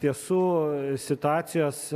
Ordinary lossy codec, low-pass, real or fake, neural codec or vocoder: MP3, 96 kbps; 14.4 kHz; real; none